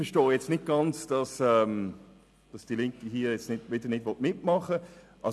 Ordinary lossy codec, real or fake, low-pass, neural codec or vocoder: none; real; none; none